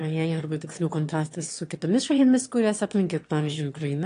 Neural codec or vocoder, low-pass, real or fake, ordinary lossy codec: autoencoder, 22.05 kHz, a latent of 192 numbers a frame, VITS, trained on one speaker; 9.9 kHz; fake; AAC, 48 kbps